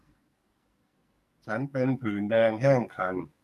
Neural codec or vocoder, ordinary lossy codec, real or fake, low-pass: codec, 44.1 kHz, 2.6 kbps, SNAC; none; fake; 14.4 kHz